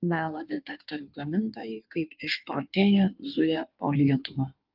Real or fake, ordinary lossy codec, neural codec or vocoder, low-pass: fake; Opus, 24 kbps; codec, 16 kHz in and 24 kHz out, 1.1 kbps, FireRedTTS-2 codec; 5.4 kHz